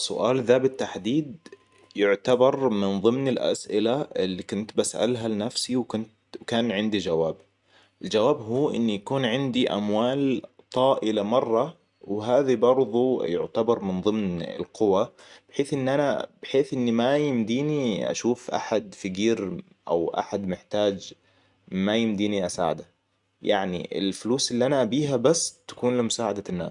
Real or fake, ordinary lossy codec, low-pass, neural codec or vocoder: real; none; 10.8 kHz; none